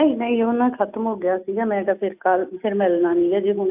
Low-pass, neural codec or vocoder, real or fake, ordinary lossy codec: 3.6 kHz; none; real; AAC, 32 kbps